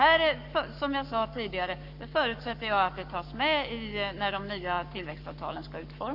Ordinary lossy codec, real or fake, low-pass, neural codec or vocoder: none; fake; 5.4 kHz; codec, 44.1 kHz, 7.8 kbps, Pupu-Codec